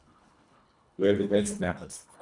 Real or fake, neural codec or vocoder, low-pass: fake; codec, 24 kHz, 1.5 kbps, HILCodec; 10.8 kHz